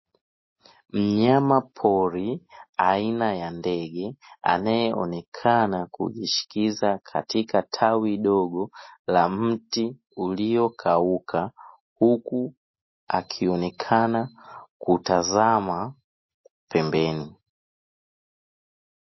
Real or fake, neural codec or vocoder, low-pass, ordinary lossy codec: real; none; 7.2 kHz; MP3, 24 kbps